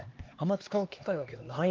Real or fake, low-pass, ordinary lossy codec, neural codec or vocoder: fake; 7.2 kHz; Opus, 32 kbps; codec, 16 kHz, 2 kbps, X-Codec, HuBERT features, trained on LibriSpeech